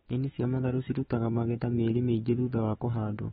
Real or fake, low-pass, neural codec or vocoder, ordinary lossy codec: fake; 19.8 kHz; autoencoder, 48 kHz, 128 numbers a frame, DAC-VAE, trained on Japanese speech; AAC, 16 kbps